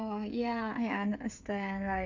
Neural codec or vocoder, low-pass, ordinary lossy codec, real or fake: codec, 16 kHz, 8 kbps, FreqCodec, smaller model; 7.2 kHz; none; fake